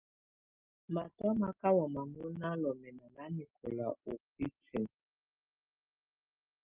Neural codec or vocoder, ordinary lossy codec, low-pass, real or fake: none; Opus, 24 kbps; 3.6 kHz; real